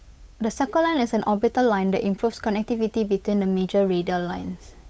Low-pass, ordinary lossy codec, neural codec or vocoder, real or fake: none; none; codec, 16 kHz, 8 kbps, FunCodec, trained on Chinese and English, 25 frames a second; fake